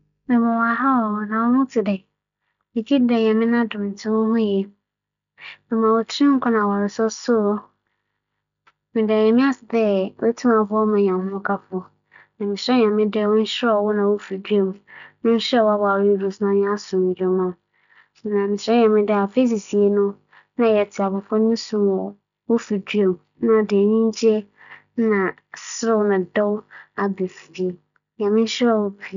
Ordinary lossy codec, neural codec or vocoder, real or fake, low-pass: none; none; real; 7.2 kHz